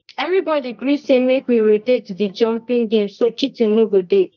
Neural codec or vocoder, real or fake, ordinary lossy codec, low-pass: codec, 24 kHz, 0.9 kbps, WavTokenizer, medium music audio release; fake; none; 7.2 kHz